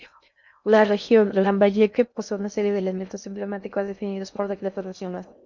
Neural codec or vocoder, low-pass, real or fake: codec, 16 kHz in and 24 kHz out, 0.6 kbps, FocalCodec, streaming, 2048 codes; 7.2 kHz; fake